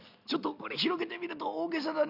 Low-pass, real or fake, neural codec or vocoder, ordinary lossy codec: 5.4 kHz; real; none; none